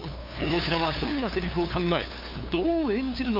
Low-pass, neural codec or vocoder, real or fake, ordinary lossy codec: 5.4 kHz; codec, 16 kHz, 2 kbps, FunCodec, trained on LibriTTS, 25 frames a second; fake; MP3, 48 kbps